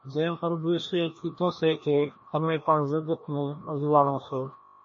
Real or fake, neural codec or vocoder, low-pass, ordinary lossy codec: fake; codec, 16 kHz, 1 kbps, FreqCodec, larger model; 7.2 kHz; MP3, 32 kbps